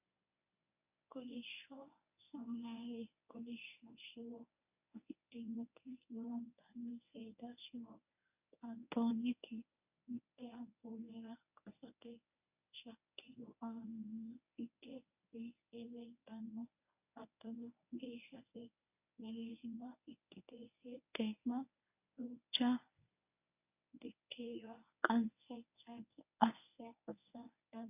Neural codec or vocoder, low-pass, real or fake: codec, 24 kHz, 0.9 kbps, WavTokenizer, medium speech release version 1; 3.6 kHz; fake